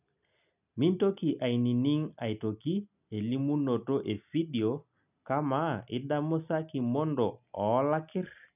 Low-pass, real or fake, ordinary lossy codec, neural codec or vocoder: 3.6 kHz; real; none; none